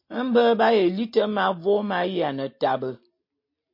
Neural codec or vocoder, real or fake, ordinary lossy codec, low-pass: none; real; MP3, 32 kbps; 5.4 kHz